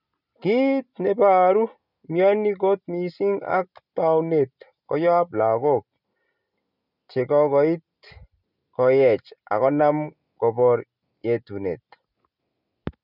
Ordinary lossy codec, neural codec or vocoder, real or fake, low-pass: none; none; real; 5.4 kHz